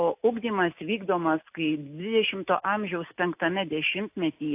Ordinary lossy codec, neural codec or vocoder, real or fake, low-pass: AAC, 32 kbps; none; real; 3.6 kHz